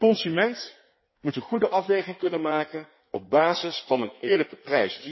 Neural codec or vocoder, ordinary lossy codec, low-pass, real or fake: codec, 16 kHz in and 24 kHz out, 1.1 kbps, FireRedTTS-2 codec; MP3, 24 kbps; 7.2 kHz; fake